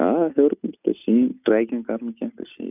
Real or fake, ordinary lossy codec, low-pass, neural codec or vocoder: real; none; 3.6 kHz; none